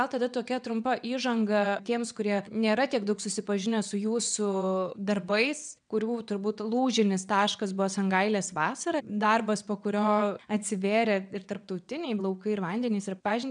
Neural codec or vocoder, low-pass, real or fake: vocoder, 22.05 kHz, 80 mel bands, WaveNeXt; 9.9 kHz; fake